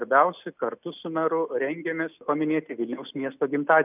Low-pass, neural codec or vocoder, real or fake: 3.6 kHz; none; real